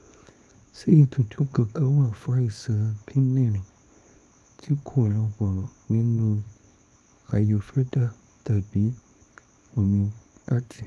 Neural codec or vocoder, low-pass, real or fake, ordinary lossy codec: codec, 24 kHz, 0.9 kbps, WavTokenizer, small release; none; fake; none